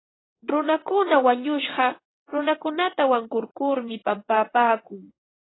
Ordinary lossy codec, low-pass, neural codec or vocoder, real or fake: AAC, 16 kbps; 7.2 kHz; vocoder, 22.05 kHz, 80 mel bands, WaveNeXt; fake